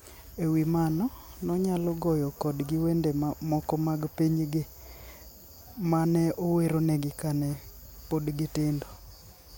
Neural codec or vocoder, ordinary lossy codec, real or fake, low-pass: none; none; real; none